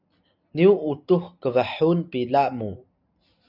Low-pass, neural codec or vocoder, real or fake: 5.4 kHz; none; real